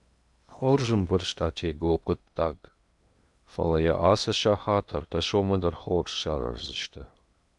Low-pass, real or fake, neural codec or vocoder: 10.8 kHz; fake; codec, 16 kHz in and 24 kHz out, 0.8 kbps, FocalCodec, streaming, 65536 codes